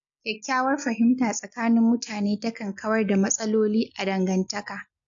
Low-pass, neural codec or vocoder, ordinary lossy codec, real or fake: 7.2 kHz; none; AAC, 64 kbps; real